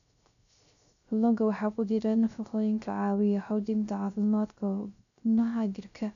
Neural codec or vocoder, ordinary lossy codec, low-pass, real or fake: codec, 16 kHz, 0.3 kbps, FocalCodec; none; 7.2 kHz; fake